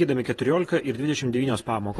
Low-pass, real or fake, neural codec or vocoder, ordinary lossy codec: 19.8 kHz; fake; vocoder, 48 kHz, 128 mel bands, Vocos; AAC, 32 kbps